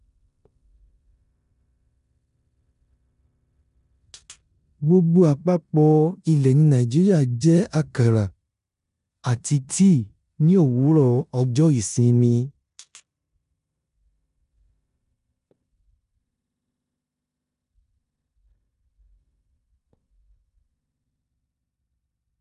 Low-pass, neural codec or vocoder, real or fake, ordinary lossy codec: 10.8 kHz; codec, 16 kHz in and 24 kHz out, 0.9 kbps, LongCat-Audio-Codec, four codebook decoder; fake; none